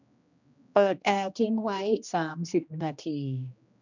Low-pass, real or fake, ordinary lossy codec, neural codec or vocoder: 7.2 kHz; fake; none; codec, 16 kHz, 1 kbps, X-Codec, HuBERT features, trained on general audio